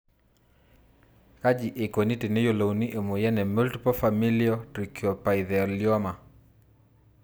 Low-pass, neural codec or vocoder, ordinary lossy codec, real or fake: none; none; none; real